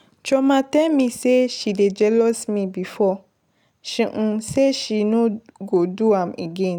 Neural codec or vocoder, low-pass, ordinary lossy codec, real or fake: none; none; none; real